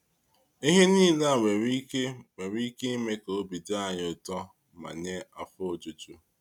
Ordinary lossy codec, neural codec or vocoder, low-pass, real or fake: none; none; 19.8 kHz; real